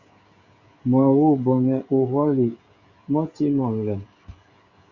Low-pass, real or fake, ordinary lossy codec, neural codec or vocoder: 7.2 kHz; fake; Opus, 64 kbps; codec, 16 kHz, 16 kbps, FreqCodec, smaller model